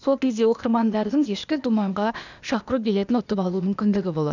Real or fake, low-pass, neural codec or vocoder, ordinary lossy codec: fake; 7.2 kHz; codec, 16 kHz, 0.8 kbps, ZipCodec; none